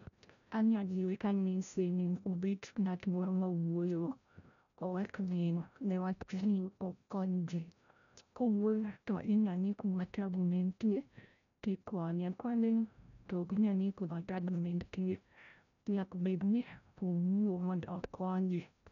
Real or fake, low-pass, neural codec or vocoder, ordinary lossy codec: fake; 7.2 kHz; codec, 16 kHz, 0.5 kbps, FreqCodec, larger model; none